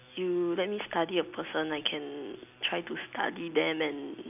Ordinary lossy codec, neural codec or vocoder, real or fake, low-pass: none; none; real; 3.6 kHz